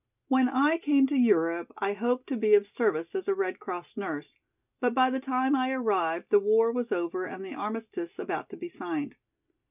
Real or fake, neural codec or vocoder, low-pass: real; none; 3.6 kHz